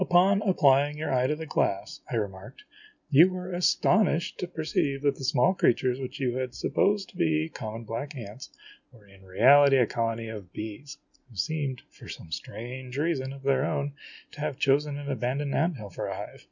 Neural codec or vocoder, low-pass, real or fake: none; 7.2 kHz; real